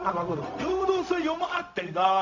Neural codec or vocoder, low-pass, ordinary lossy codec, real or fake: codec, 16 kHz, 0.4 kbps, LongCat-Audio-Codec; 7.2 kHz; none; fake